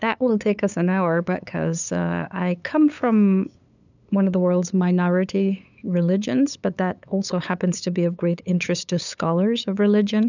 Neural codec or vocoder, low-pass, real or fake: codec, 16 kHz, 8 kbps, FunCodec, trained on LibriTTS, 25 frames a second; 7.2 kHz; fake